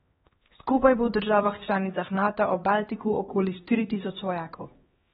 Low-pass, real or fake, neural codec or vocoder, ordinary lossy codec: 7.2 kHz; fake; codec, 16 kHz, 2 kbps, X-Codec, HuBERT features, trained on LibriSpeech; AAC, 16 kbps